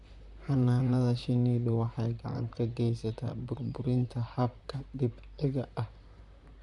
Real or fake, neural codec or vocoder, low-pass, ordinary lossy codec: fake; vocoder, 44.1 kHz, 128 mel bands, Pupu-Vocoder; 10.8 kHz; none